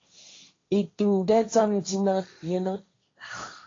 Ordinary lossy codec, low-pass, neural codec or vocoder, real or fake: AAC, 32 kbps; 7.2 kHz; codec, 16 kHz, 1.1 kbps, Voila-Tokenizer; fake